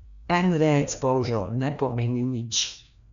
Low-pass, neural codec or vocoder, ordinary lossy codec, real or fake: 7.2 kHz; codec, 16 kHz, 1 kbps, FreqCodec, larger model; none; fake